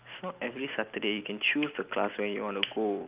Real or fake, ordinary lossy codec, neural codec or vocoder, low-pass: real; Opus, 24 kbps; none; 3.6 kHz